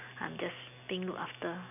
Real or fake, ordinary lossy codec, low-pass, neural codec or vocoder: real; none; 3.6 kHz; none